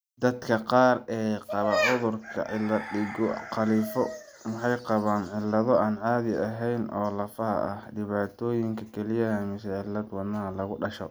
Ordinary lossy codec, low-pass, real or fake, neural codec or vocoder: none; none; real; none